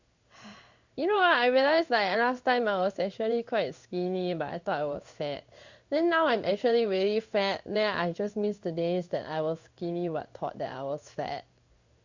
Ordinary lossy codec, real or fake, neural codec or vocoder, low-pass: Opus, 64 kbps; fake; codec, 16 kHz in and 24 kHz out, 1 kbps, XY-Tokenizer; 7.2 kHz